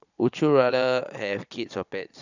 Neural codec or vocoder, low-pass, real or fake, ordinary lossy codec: vocoder, 22.05 kHz, 80 mel bands, Vocos; 7.2 kHz; fake; none